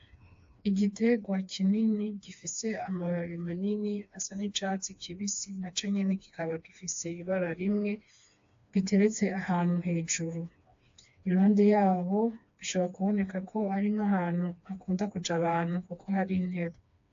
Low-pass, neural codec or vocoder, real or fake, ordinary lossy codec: 7.2 kHz; codec, 16 kHz, 2 kbps, FreqCodec, smaller model; fake; AAC, 48 kbps